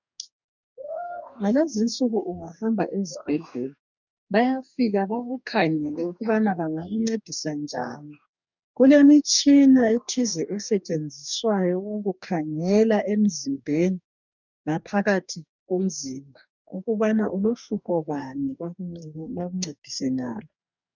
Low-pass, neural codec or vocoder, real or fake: 7.2 kHz; codec, 44.1 kHz, 2.6 kbps, DAC; fake